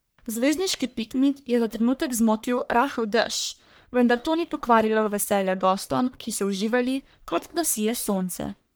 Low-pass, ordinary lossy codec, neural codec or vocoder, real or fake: none; none; codec, 44.1 kHz, 1.7 kbps, Pupu-Codec; fake